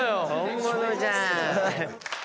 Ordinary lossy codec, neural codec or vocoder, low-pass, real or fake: none; none; none; real